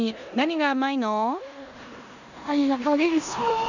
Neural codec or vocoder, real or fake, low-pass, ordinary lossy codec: codec, 16 kHz in and 24 kHz out, 0.9 kbps, LongCat-Audio-Codec, four codebook decoder; fake; 7.2 kHz; none